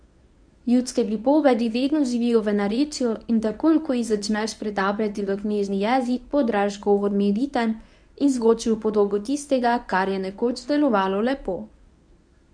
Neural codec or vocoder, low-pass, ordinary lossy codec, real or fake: codec, 24 kHz, 0.9 kbps, WavTokenizer, medium speech release version 1; 9.9 kHz; none; fake